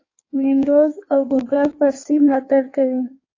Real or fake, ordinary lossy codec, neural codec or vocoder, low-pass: fake; AAC, 32 kbps; codec, 16 kHz in and 24 kHz out, 1.1 kbps, FireRedTTS-2 codec; 7.2 kHz